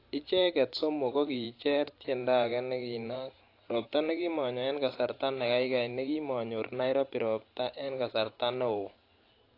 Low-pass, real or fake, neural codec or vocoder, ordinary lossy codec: 5.4 kHz; fake; vocoder, 44.1 kHz, 128 mel bands every 512 samples, BigVGAN v2; AAC, 32 kbps